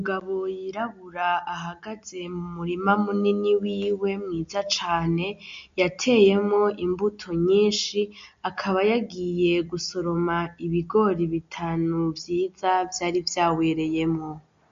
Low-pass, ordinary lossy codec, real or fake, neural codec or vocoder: 7.2 kHz; MP3, 48 kbps; real; none